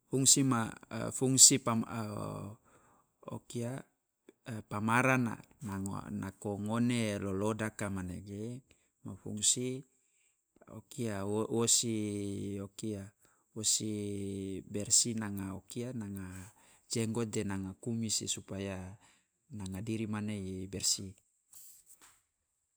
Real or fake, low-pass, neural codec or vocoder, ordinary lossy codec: real; none; none; none